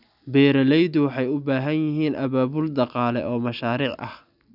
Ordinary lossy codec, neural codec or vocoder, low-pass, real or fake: none; none; 5.4 kHz; real